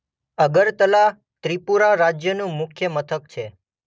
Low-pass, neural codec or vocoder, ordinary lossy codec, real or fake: none; none; none; real